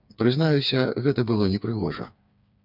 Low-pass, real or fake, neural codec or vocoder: 5.4 kHz; fake; codec, 16 kHz, 4 kbps, FreqCodec, smaller model